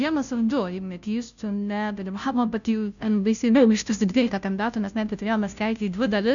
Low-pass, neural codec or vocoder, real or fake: 7.2 kHz; codec, 16 kHz, 0.5 kbps, FunCodec, trained on Chinese and English, 25 frames a second; fake